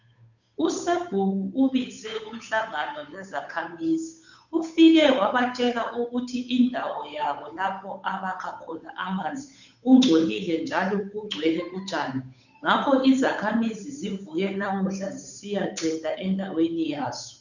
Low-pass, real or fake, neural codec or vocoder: 7.2 kHz; fake; codec, 16 kHz, 8 kbps, FunCodec, trained on Chinese and English, 25 frames a second